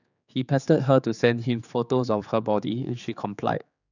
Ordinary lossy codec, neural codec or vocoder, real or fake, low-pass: none; codec, 16 kHz, 4 kbps, X-Codec, HuBERT features, trained on general audio; fake; 7.2 kHz